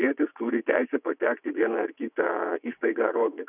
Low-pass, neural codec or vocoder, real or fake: 3.6 kHz; vocoder, 22.05 kHz, 80 mel bands, WaveNeXt; fake